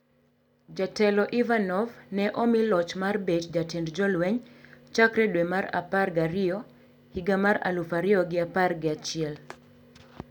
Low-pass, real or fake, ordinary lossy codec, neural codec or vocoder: 19.8 kHz; real; none; none